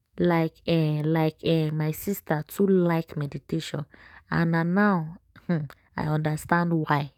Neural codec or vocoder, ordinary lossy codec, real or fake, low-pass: codec, 44.1 kHz, 7.8 kbps, DAC; none; fake; 19.8 kHz